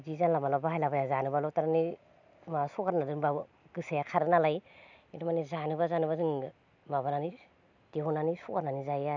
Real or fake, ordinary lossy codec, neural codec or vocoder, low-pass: real; none; none; 7.2 kHz